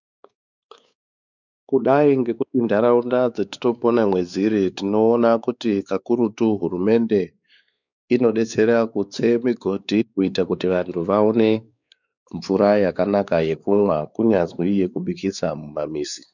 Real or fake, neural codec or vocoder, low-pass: fake; codec, 16 kHz, 4 kbps, X-Codec, WavLM features, trained on Multilingual LibriSpeech; 7.2 kHz